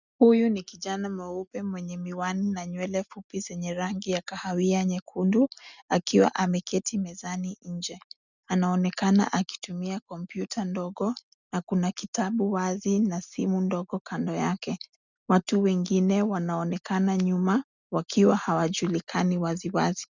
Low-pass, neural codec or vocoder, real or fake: 7.2 kHz; none; real